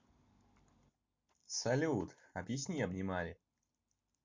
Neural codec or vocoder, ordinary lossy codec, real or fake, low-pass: none; MP3, 48 kbps; real; 7.2 kHz